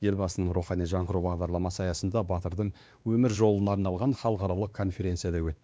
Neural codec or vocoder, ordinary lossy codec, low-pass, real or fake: codec, 16 kHz, 2 kbps, X-Codec, WavLM features, trained on Multilingual LibriSpeech; none; none; fake